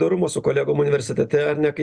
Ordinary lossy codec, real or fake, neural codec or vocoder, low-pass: Opus, 64 kbps; real; none; 9.9 kHz